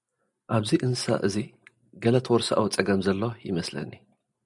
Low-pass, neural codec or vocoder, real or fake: 10.8 kHz; none; real